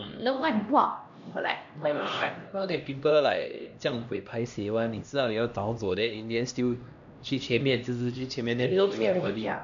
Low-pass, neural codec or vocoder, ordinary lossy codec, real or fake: 7.2 kHz; codec, 16 kHz, 1 kbps, X-Codec, HuBERT features, trained on LibriSpeech; none; fake